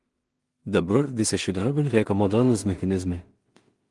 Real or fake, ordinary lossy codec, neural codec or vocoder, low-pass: fake; Opus, 24 kbps; codec, 16 kHz in and 24 kHz out, 0.4 kbps, LongCat-Audio-Codec, two codebook decoder; 10.8 kHz